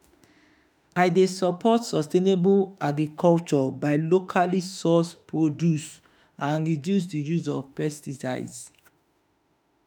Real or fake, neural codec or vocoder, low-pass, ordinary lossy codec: fake; autoencoder, 48 kHz, 32 numbers a frame, DAC-VAE, trained on Japanese speech; none; none